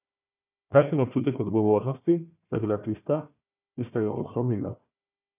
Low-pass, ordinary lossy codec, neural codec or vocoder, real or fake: 3.6 kHz; none; codec, 16 kHz, 1 kbps, FunCodec, trained on Chinese and English, 50 frames a second; fake